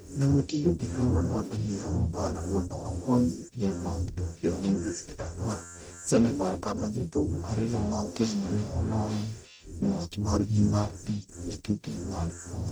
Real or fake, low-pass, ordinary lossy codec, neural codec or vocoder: fake; none; none; codec, 44.1 kHz, 0.9 kbps, DAC